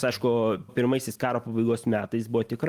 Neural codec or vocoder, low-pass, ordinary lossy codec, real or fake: none; 14.4 kHz; Opus, 32 kbps; real